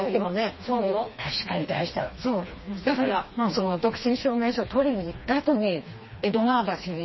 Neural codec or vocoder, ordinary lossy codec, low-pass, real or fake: codec, 16 kHz, 2 kbps, FreqCodec, smaller model; MP3, 24 kbps; 7.2 kHz; fake